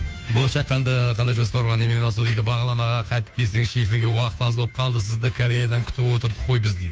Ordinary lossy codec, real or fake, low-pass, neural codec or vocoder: none; fake; none; codec, 16 kHz, 2 kbps, FunCodec, trained on Chinese and English, 25 frames a second